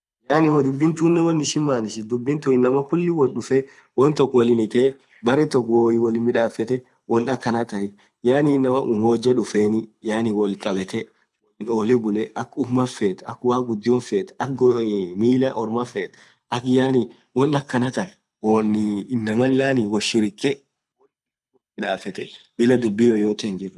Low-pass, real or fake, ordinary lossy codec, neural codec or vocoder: none; fake; none; codec, 24 kHz, 6 kbps, HILCodec